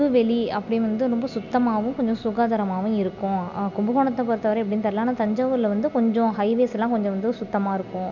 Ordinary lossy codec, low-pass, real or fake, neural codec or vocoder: none; 7.2 kHz; real; none